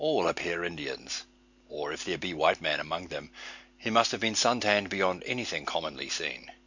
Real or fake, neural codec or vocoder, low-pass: real; none; 7.2 kHz